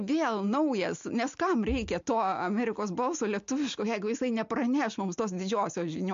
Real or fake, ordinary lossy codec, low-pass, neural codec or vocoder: real; MP3, 48 kbps; 7.2 kHz; none